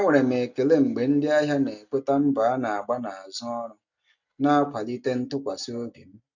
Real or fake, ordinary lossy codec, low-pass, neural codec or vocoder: real; none; 7.2 kHz; none